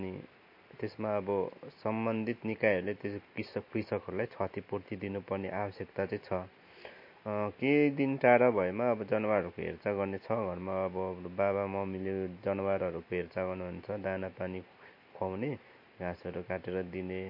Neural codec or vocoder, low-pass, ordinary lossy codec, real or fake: none; 5.4 kHz; MP3, 32 kbps; real